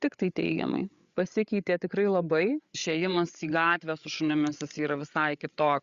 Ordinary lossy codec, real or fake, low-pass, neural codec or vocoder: Opus, 64 kbps; fake; 7.2 kHz; codec, 16 kHz, 8 kbps, FreqCodec, larger model